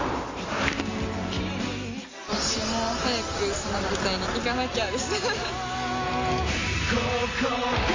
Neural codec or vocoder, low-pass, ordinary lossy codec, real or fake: none; 7.2 kHz; AAC, 32 kbps; real